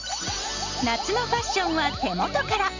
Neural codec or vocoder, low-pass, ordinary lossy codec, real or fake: none; 7.2 kHz; Opus, 64 kbps; real